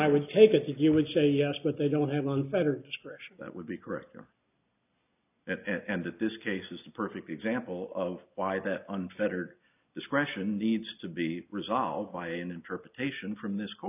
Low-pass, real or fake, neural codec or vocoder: 3.6 kHz; real; none